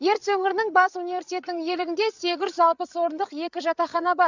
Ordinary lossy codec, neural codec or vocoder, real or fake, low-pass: none; codec, 16 kHz, 8 kbps, FreqCodec, larger model; fake; 7.2 kHz